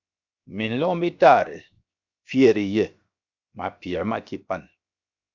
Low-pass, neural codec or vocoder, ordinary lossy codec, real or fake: 7.2 kHz; codec, 16 kHz, 0.7 kbps, FocalCodec; Opus, 64 kbps; fake